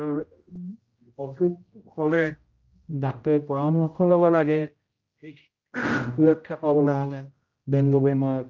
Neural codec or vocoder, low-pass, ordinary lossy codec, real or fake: codec, 16 kHz, 0.5 kbps, X-Codec, HuBERT features, trained on general audio; 7.2 kHz; Opus, 24 kbps; fake